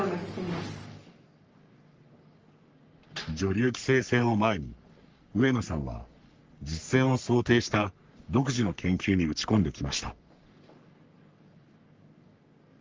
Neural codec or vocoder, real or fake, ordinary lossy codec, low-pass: codec, 44.1 kHz, 3.4 kbps, Pupu-Codec; fake; Opus, 24 kbps; 7.2 kHz